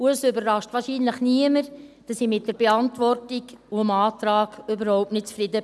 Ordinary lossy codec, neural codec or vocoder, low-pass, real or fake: none; none; none; real